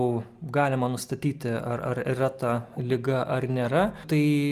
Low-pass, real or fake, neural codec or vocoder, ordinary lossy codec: 14.4 kHz; real; none; Opus, 32 kbps